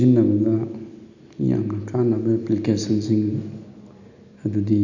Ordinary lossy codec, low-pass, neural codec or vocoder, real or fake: none; 7.2 kHz; none; real